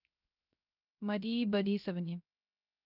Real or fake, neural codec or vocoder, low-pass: fake; codec, 16 kHz, 0.3 kbps, FocalCodec; 5.4 kHz